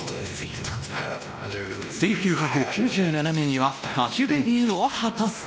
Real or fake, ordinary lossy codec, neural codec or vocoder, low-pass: fake; none; codec, 16 kHz, 1 kbps, X-Codec, WavLM features, trained on Multilingual LibriSpeech; none